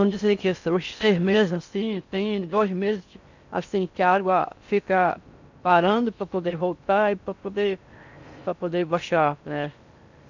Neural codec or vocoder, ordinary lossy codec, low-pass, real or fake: codec, 16 kHz in and 24 kHz out, 0.6 kbps, FocalCodec, streaming, 4096 codes; none; 7.2 kHz; fake